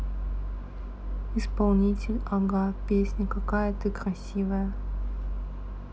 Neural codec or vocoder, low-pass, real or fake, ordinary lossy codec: none; none; real; none